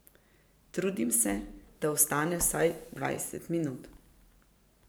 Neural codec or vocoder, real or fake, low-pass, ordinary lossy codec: vocoder, 44.1 kHz, 128 mel bands every 512 samples, BigVGAN v2; fake; none; none